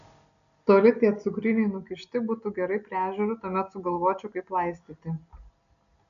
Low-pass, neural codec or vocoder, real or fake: 7.2 kHz; none; real